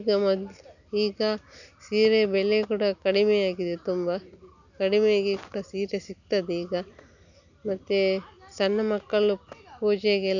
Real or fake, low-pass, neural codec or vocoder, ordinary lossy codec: real; 7.2 kHz; none; none